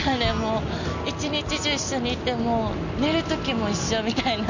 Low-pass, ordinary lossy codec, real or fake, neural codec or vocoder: 7.2 kHz; none; real; none